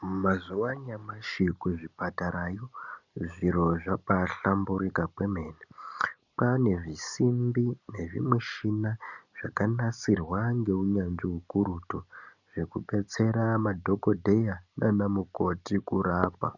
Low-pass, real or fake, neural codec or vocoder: 7.2 kHz; real; none